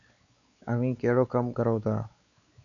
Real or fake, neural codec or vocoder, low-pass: fake; codec, 16 kHz, 4 kbps, X-Codec, WavLM features, trained on Multilingual LibriSpeech; 7.2 kHz